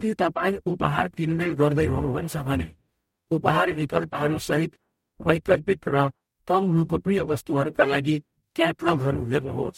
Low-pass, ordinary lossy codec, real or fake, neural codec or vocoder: 19.8 kHz; MP3, 64 kbps; fake; codec, 44.1 kHz, 0.9 kbps, DAC